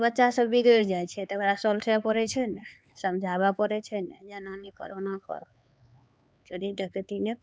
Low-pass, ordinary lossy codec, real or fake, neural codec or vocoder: none; none; fake; codec, 16 kHz, 4 kbps, X-Codec, HuBERT features, trained on LibriSpeech